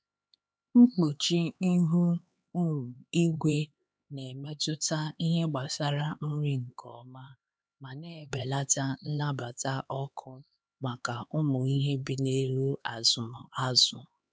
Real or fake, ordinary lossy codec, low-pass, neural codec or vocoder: fake; none; none; codec, 16 kHz, 4 kbps, X-Codec, HuBERT features, trained on LibriSpeech